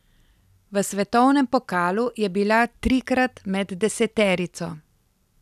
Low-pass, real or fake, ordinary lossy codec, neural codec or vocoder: 14.4 kHz; real; none; none